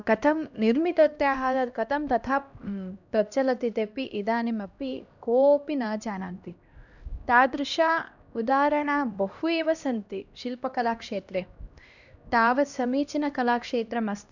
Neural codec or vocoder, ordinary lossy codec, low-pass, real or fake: codec, 16 kHz, 1 kbps, X-Codec, HuBERT features, trained on LibriSpeech; none; 7.2 kHz; fake